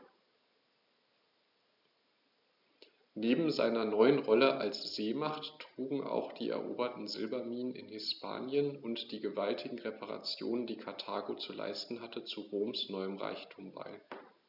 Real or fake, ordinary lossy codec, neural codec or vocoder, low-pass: real; none; none; 5.4 kHz